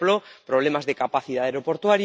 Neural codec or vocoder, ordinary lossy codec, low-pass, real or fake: none; none; none; real